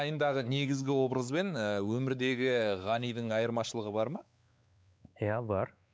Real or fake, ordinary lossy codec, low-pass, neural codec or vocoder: fake; none; none; codec, 16 kHz, 4 kbps, X-Codec, WavLM features, trained on Multilingual LibriSpeech